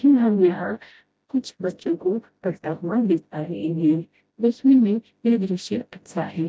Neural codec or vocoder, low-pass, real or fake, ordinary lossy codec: codec, 16 kHz, 0.5 kbps, FreqCodec, smaller model; none; fake; none